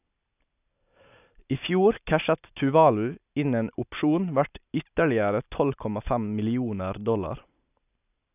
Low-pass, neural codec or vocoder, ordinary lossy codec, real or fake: 3.6 kHz; none; none; real